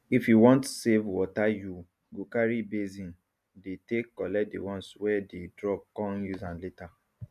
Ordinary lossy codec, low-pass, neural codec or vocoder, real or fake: none; 14.4 kHz; none; real